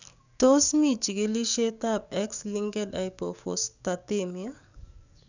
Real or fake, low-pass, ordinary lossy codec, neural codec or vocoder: fake; 7.2 kHz; none; codec, 44.1 kHz, 7.8 kbps, DAC